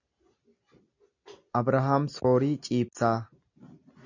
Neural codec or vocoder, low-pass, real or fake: none; 7.2 kHz; real